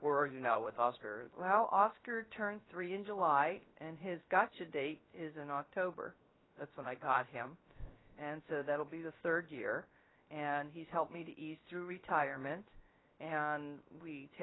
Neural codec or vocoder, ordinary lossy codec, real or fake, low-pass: codec, 16 kHz, 0.3 kbps, FocalCodec; AAC, 16 kbps; fake; 7.2 kHz